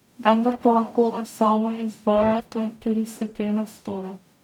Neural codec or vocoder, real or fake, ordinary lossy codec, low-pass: codec, 44.1 kHz, 0.9 kbps, DAC; fake; none; 19.8 kHz